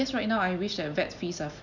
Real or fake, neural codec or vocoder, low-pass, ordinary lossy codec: real; none; 7.2 kHz; none